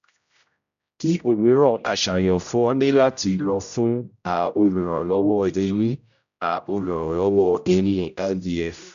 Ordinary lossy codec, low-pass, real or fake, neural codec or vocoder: AAC, 96 kbps; 7.2 kHz; fake; codec, 16 kHz, 0.5 kbps, X-Codec, HuBERT features, trained on general audio